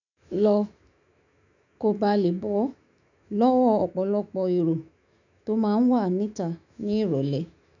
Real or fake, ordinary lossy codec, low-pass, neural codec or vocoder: fake; none; 7.2 kHz; autoencoder, 48 kHz, 128 numbers a frame, DAC-VAE, trained on Japanese speech